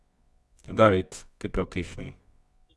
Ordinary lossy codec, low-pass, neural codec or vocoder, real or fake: none; none; codec, 24 kHz, 0.9 kbps, WavTokenizer, medium music audio release; fake